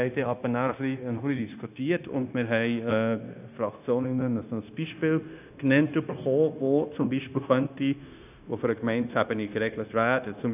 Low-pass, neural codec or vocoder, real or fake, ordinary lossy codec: 3.6 kHz; codec, 16 kHz, 0.9 kbps, LongCat-Audio-Codec; fake; none